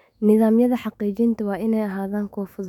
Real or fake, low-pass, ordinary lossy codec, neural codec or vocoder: fake; 19.8 kHz; none; autoencoder, 48 kHz, 128 numbers a frame, DAC-VAE, trained on Japanese speech